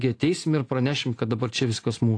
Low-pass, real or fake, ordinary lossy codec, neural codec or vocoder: 9.9 kHz; real; AAC, 48 kbps; none